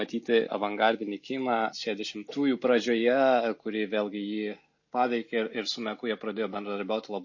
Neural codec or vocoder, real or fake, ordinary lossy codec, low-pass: none; real; MP3, 32 kbps; 7.2 kHz